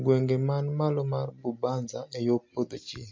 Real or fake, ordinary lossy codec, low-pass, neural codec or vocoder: real; MP3, 48 kbps; 7.2 kHz; none